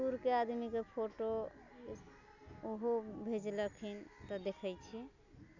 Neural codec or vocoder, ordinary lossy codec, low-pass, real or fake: none; none; 7.2 kHz; real